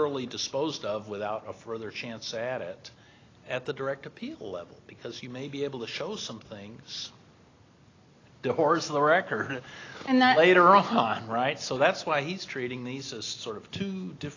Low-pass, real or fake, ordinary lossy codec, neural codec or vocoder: 7.2 kHz; real; AAC, 32 kbps; none